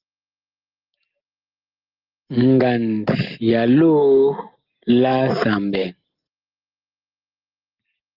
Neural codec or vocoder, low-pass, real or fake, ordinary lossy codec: none; 5.4 kHz; real; Opus, 24 kbps